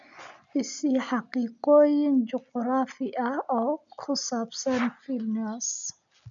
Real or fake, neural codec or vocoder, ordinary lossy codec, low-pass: real; none; none; 7.2 kHz